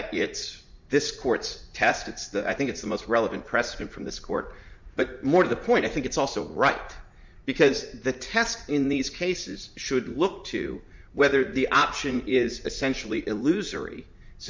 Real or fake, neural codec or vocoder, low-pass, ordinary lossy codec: fake; vocoder, 44.1 kHz, 80 mel bands, Vocos; 7.2 kHz; MP3, 64 kbps